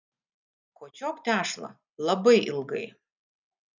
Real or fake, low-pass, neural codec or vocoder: real; 7.2 kHz; none